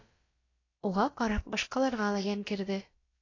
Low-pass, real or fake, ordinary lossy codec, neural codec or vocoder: 7.2 kHz; fake; AAC, 32 kbps; codec, 16 kHz, about 1 kbps, DyCAST, with the encoder's durations